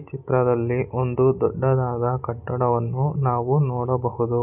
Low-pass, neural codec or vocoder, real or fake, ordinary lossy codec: 3.6 kHz; none; real; none